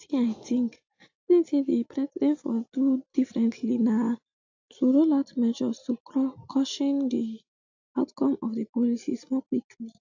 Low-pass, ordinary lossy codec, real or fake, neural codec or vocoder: 7.2 kHz; AAC, 48 kbps; real; none